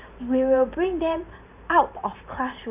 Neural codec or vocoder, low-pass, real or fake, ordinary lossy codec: vocoder, 44.1 kHz, 128 mel bands every 512 samples, BigVGAN v2; 3.6 kHz; fake; none